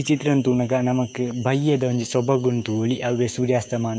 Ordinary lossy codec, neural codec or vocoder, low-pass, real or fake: none; codec, 16 kHz, 6 kbps, DAC; none; fake